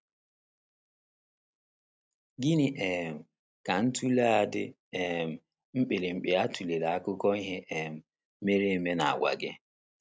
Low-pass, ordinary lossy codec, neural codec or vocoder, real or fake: none; none; none; real